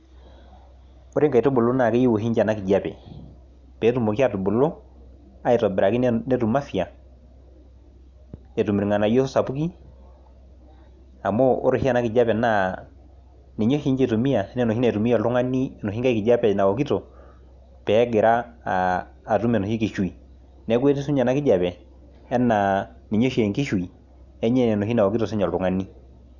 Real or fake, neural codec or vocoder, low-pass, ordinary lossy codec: real; none; 7.2 kHz; none